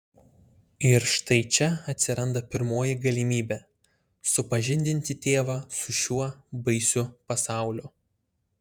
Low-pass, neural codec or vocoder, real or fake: 19.8 kHz; vocoder, 48 kHz, 128 mel bands, Vocos; fake